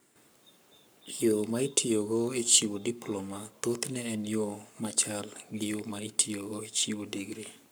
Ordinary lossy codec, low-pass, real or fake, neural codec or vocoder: none; none; fake; codec, 44.1 kHz, 7.8 kbps, Pupu-Codec